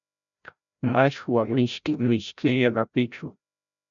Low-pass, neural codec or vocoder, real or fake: 7.2 kHz; codec, 16 kHz, 0.5 kbps, FreqCodec, larger model; fake